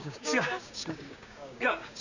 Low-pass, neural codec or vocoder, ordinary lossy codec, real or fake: 7.2 kHz; codec, 16 kHz, 6 kbps, DAC; none; fake